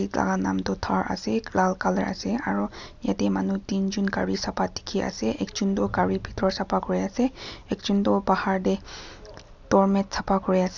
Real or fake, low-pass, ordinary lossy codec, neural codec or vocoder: real; 7.2 kHz; none; none